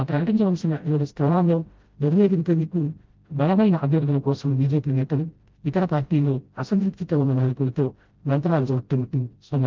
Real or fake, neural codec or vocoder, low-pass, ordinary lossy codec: fake; codec, 16 kHz, 0.5 kbps, FreqCodec, smaller model; 7.2 kHz; Opus, 32 kbps